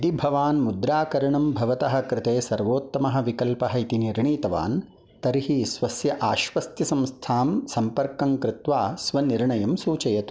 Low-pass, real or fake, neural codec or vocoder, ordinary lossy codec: none; real; none; none